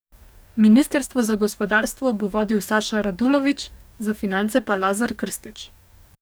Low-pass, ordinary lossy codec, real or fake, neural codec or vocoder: none; none; fake; codec, 44.1 kHz, 2.6 kbps, DAC